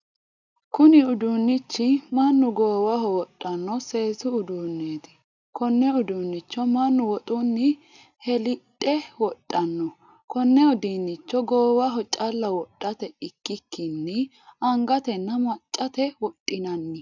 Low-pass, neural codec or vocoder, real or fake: 7.2 kHz; none; real